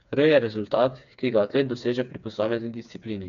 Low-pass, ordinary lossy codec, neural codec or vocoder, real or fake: 7.2 kHz; none; codec, 16 kHz, 4 kbps, FreqCodec, smaller model; fake